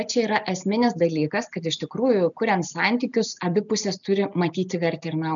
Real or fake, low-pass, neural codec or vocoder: real; 7.2 kHz; none